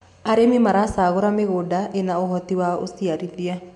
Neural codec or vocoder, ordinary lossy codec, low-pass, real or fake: none; MP3, 64 kbps; 10.8 kHz; real